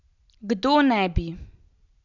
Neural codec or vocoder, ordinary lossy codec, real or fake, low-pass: none; none; real; 7.2 kHz